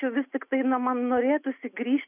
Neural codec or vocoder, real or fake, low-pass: none; real; 3.6 kHz